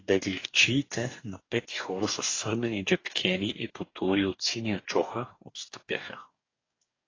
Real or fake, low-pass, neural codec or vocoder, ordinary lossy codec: fake; 7.2 kHz; codec, 44.1 kHz, 2.6 kbps, DAC; AAC, 32 kbps